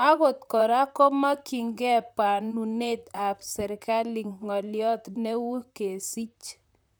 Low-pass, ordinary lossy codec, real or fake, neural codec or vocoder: none; none; fake; vocoder, 44.1 kHz, 128 mel bands, Pupu-Vocoder